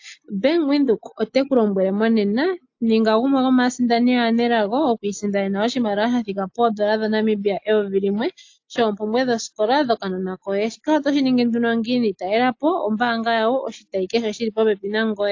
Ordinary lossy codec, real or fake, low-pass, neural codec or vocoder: AAC, 48 kbps; real; 7.2 kHz; none